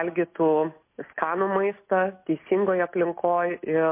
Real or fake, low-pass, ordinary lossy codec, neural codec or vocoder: real; 3.6 kHz; MP3, 24 kbps; none